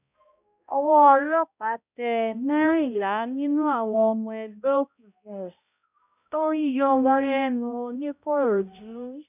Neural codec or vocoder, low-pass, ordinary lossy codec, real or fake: codec, 16 kHz, 0.5 kbps, X-Codec, HuBERT features, trained on balanced general audio; 3.6 kHz; none; fake